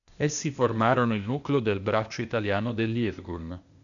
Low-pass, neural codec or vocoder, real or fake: 7.2 kHz; codec, 16 kHz, 0.8 kbps, ZipCodec; fake